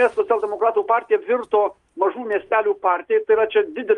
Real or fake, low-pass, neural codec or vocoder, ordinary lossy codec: real; 10.8 kHz; none; Opus, 32 kbps